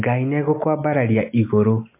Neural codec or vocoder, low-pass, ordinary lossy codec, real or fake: none; 3.6 kHz; MP3, 24 kbps; real